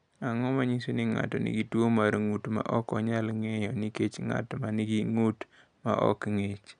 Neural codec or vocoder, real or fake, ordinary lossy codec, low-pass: none; real; none; 9.9 kHz